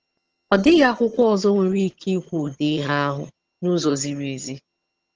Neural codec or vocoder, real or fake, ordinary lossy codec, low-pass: vocoder, 22.05 kHz, 80 mel bands, HiFi-GAN; fake; Opus, 16 kbps; 7.2 kHz